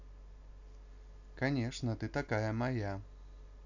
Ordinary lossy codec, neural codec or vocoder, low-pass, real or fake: none; none; 7.2 kHz; real